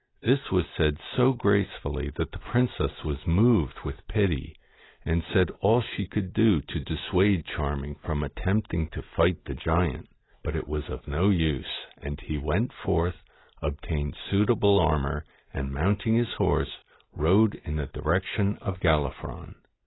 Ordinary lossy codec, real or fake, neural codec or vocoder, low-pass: AAC, 16 kbps; real; none; 7.2 kHz